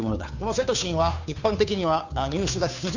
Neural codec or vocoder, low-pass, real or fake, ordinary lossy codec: codec, 16 kHz, 2 kbps, FunCodec, trained on Chinese and English, 25 frames a second; 7.2 kHz; fake; none